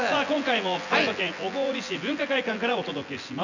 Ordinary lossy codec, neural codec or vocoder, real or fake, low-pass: none; vocoder, 24 kHz, 100 mel bands, Vocos; fake; 7.2 kHz